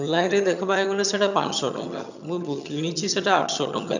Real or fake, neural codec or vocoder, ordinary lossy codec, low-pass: fake; vocoder, 22.05 kHz, 80 mel bands, HiFi-GAN; none; 7.2 kHz